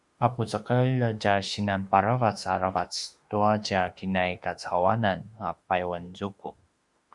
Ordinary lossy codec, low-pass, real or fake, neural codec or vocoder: Opus, 64 kbps; 10.8 kHz; fake; autoencoder, 48 kHz, 32 numbers a frame, DAC-VAE, trained on Japanese speech